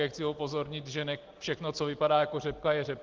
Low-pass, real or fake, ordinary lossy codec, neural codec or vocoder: 7.2 kHz; real; Opus, 16 kbps; none